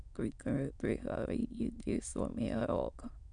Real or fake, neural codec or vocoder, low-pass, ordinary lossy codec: fake; autoencoder, 22.05 kHz, a latent of 192 numbers a frame, VITS, trained on many speakers; 9.9 kHz; none